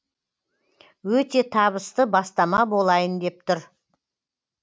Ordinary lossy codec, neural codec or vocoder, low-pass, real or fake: none; none; none; real